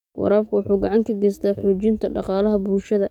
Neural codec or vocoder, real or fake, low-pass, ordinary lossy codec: codec, 44.1 kHz, 7.8 kbps, DAC; fake; 19.8 kHz; none